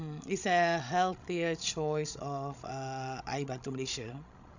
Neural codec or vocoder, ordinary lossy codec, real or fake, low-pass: codec, 16 kHz, 16 kbps, FreqCodec, larger model; none; fake; 7.2 kHz